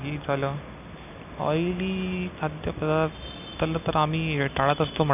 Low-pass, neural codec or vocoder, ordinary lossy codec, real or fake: 3.6 kHz; none; none; real